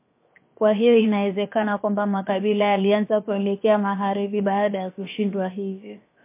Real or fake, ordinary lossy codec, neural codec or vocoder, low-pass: fake; MP3, 24 kbps; codec, 16 kHz, 0.7 kbps, FocalCodec; 3.6 kHz